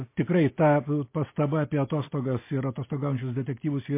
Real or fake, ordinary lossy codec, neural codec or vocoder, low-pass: real; MP3, 24 kbps; none; 3.6 kHz